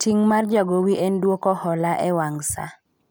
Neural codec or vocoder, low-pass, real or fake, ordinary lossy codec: none; none; real; none